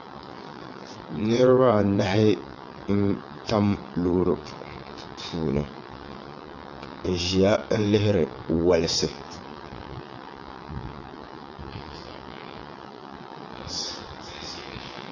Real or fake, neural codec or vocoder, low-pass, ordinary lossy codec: fake; vocoder, 22.05 kHz, 80 mel bands, WaveNeXt; 7.2 kHz; MP3, 48 kbps